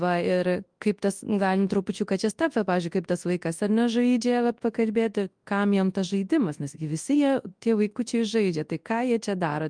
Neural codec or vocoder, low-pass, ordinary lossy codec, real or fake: codec, 24 kHz, 0.9 kbps, WavTokenizer, large speech release; 9.9 kHz; Opus, 32 kbps; fake